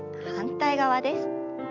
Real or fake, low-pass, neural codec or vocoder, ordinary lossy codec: real; 7.2 kHz; none; none